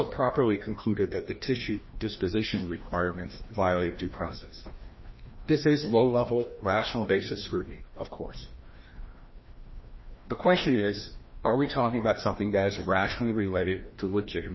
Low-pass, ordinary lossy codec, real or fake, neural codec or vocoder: 7.2 kHz; MP3, 24 kbps; fake; codec, 16 kHz, 1 kbps, FreqCodec, larger model